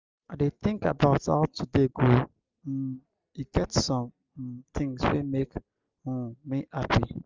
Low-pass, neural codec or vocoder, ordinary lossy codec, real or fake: 7.2 kHz; none; Opus, 32 kbps; real